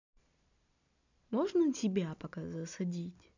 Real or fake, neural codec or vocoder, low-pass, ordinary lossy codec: real; none; 7.2 kHz; none